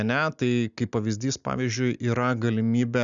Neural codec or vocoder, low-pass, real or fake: none; 7.2 kHz; real